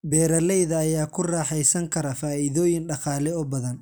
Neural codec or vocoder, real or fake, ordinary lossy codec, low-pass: none; real; none; none